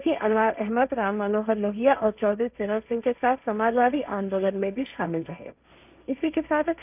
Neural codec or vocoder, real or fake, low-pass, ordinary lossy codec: codec, 16 kHz, 1.1 kbps, Voila-Tokenizer; fake; 3.6 kHz; none